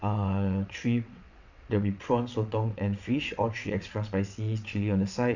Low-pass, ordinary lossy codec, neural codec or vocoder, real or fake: 7.2 kHz; none; vocoder, 22.05 kHz, 80 mel bands, WaveNeXt; fake